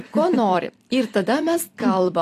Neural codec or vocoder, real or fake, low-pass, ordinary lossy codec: vocoder, 44.1 kHz, 128 mel bands every 256 samples, BigVGAN v2; fake; 14.4 kHz; AAC, 64 kbps